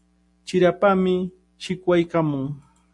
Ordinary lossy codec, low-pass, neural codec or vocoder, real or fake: MP3, 48 kbps; 10.8 kHz; none; real